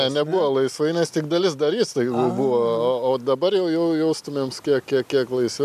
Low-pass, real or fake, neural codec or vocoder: 14.4 kHz; real; none